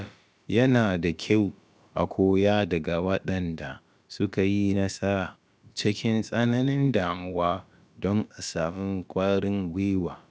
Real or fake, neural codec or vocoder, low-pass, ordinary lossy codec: fake; codec, 16 kHz, about 1 kbps, DyCAST, with the encoder's durations; none; none